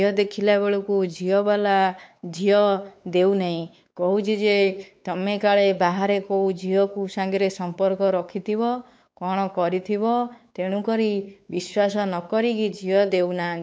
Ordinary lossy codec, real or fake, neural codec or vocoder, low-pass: none; fake; codec, 16 kHz, 4 kbps, X-Codec, WavLM features, trained on Multilingual LibriSpeech; none